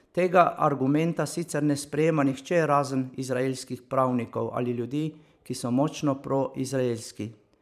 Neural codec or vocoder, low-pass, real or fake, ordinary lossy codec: vocoder, 44.1 kHz, 128 mel bands every 512 samples, BigVGAN v2; 14.4 kHz; fake; none